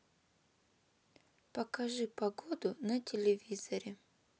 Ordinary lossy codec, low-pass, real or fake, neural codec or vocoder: none; none; real; none